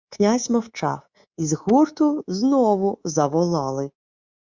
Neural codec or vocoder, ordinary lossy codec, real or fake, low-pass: codec, 24 kHz, 3.1 kbps, DualCodec; Opus, 64 kbps; fake; 7.2 kHz